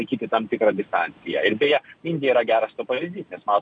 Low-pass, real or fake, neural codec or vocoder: 9.9 kHz; real; none